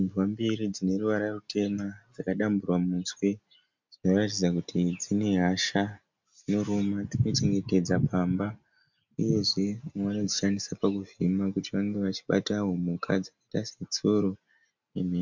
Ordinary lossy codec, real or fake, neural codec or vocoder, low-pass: MP3, 64 kbps; real; none; 7.2 kHz